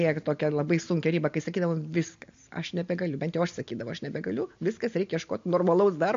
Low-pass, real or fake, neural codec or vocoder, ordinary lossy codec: 7.2 kHz; real; none; MP3, 48 kbps